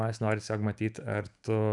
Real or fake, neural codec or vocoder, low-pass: fake; autoencoder, 48 kHz, 128 numbers a frame, DAC-VAE, trained on Japanese speech; 10.8 kHz